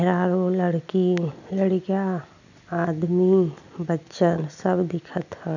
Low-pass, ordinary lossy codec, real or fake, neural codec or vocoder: 7.2 kHz; none; real; none